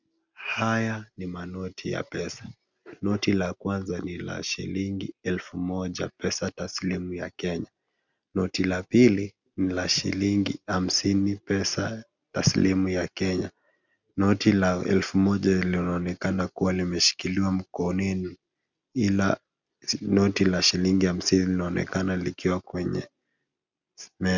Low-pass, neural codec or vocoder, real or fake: 7.2 kHz; none; real